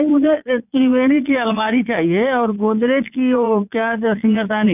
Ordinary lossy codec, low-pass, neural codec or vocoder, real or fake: none; 3.6 kHz; vocoder, 22.05 kHz, 80 mel bands, Vocos; fake